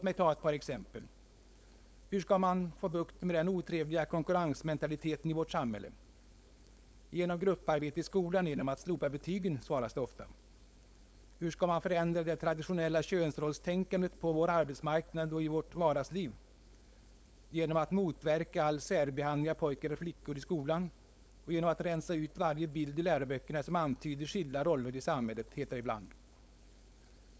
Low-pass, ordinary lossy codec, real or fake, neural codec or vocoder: none; none; fake; codec, 16 kHz, 4.8 kbps, FACodec